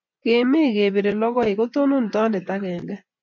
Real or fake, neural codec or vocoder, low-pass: fake; vocoder, 24 kHz, 100 mel bands, Vocos; 7.2 kHz